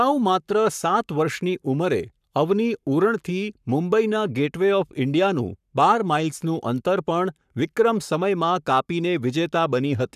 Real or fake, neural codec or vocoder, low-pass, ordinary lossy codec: fake; codec, 44.1 kHz, 7.8 kbps, Pupu-Codec; 14.4 kHz; none